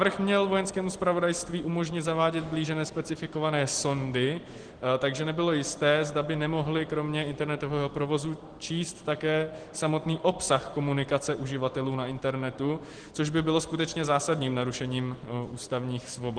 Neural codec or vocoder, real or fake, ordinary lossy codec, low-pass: none; real; Opus, 16 kbps; 9.9 kHz